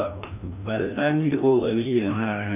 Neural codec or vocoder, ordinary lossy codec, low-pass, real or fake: codec, 16 kHz, 1 kbps, FreqCodec, larger model; none; 3.6 kHz; fake